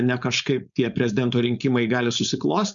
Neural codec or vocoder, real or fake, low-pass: codec, 16 kHz, 4.8 kbps, FACodec; fake; 7.2 kHz